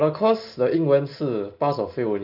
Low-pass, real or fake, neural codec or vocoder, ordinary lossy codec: 5.4 kHz; real; none; none